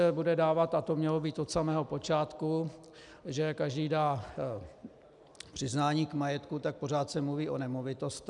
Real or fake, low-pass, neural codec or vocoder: real; 10.8 kHz; none